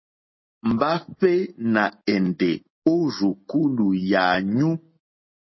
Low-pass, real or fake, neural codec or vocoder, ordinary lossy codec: 7.2 kHz; real; none; MP3, 24 kbps